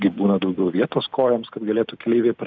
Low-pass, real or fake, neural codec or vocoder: 7.2 kHz; real; none